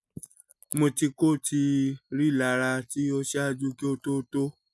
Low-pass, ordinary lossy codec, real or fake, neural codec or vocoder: none; none; real; none